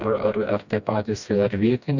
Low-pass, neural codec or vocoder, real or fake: 7.2 kHz; codec, 16 kHz, 1 kbps, FreqCodec, smaller model; fake